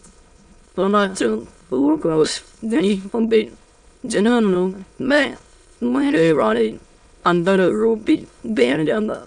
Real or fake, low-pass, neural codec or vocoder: fake; 9.9 kHz; autoencoder, 22.05 kHz, a latent of 192 numbers a frame, VITS, trained on many speakers